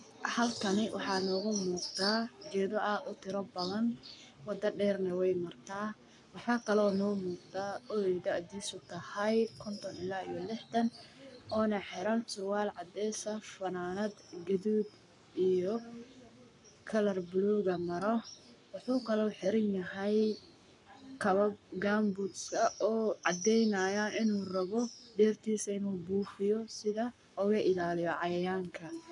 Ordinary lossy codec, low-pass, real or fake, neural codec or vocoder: none; 10.8 kHz; fake; codec, 44.1 kHz, 7.8 kbps, Pupu-Codec